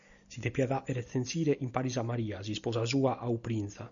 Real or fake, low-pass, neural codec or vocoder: real; 7.2 kHz; none